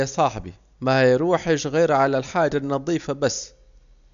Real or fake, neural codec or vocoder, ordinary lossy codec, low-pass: real; none; MP3, 96 kbps; 7.2 kHz